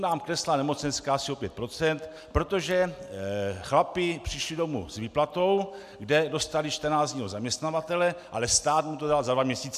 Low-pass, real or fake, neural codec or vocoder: 14.4 kHz; real; none